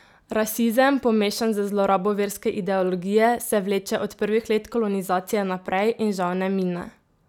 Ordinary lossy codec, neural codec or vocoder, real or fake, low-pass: none; none; real; 19.8 kHz